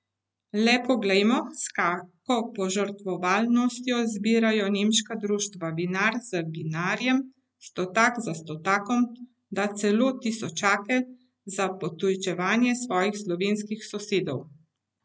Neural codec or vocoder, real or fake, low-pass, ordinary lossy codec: none; real; none; none